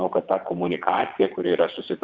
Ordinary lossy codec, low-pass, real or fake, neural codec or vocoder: Opus, 64 kbps; 7.2 kHz; fake; vocoder, 22.05 kHz, 80 mel bands, WaveNeXt